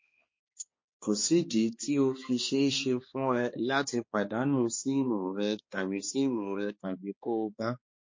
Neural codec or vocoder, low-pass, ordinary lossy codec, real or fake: codec, 16 kHz, 2 kbps, X-Codec, HuBERT features, trained on balanced general audio; 7.2 kHz; MP3, 32 kbps; fake